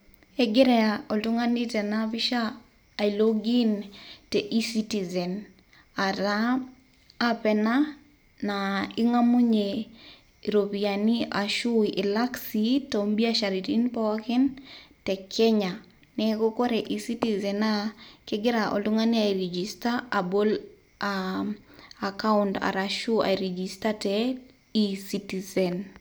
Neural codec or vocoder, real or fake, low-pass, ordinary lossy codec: none; real; none; none